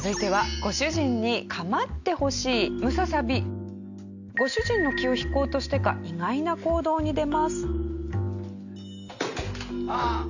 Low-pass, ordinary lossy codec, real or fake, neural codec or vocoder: 7.2 kHz; none; real; none